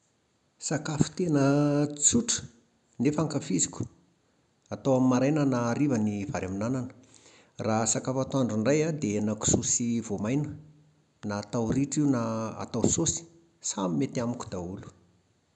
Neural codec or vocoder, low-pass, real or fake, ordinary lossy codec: none; 10.8 kHz; real; none